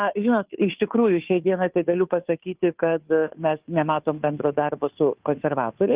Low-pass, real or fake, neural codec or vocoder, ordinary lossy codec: 3.6 kHz; fake; codec, 16 kHz, 2 kbps, FunCodec, trained on Chinese and English, 25 frames a second; Opus, 32 kbps